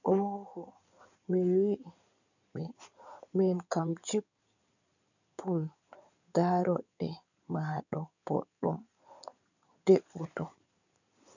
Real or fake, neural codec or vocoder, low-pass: fake; codec, 16 kHz in and 24 kHz out, 2.2 kbps, FireRedTTS-2 codec; 7.2 kHz